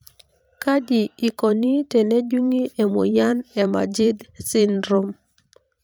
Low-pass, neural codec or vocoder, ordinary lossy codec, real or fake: none; vocoder, 44.1 kHz, 128 mel bands every 512 samples, BigVGAN v2; none; fake